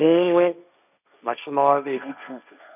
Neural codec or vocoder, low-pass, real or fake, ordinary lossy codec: codec, 16 kHz, 1.1 kbps, Voila-Tokenizer; 3.6 kHz; fake; none